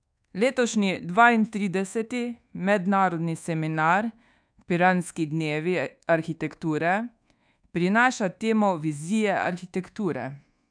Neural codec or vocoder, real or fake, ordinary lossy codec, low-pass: codec, 24 kHz, 1.2 kbps, DualCodec; fake; none; 9.9 kHz